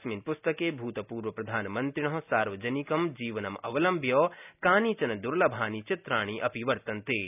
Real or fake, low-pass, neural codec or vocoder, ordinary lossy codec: real; 3.6 kHz; none; none